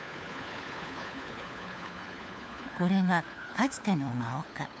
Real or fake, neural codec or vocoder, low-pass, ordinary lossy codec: fake; codec, 16 kHz, 4 kbps, FunCodec, trained on LibriTTS, 50 frames a second; none; none